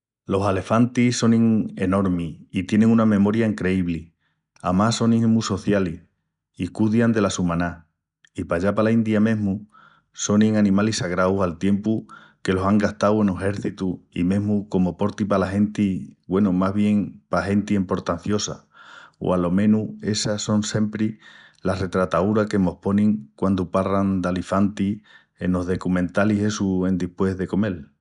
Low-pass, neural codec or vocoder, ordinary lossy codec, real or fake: 10.8 kHz; none; none; real